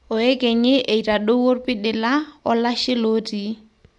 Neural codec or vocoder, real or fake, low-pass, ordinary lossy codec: none; real; 10.8 kHz; none